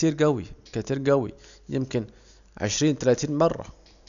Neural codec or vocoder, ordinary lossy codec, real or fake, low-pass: none; none; real; 7.2 kHz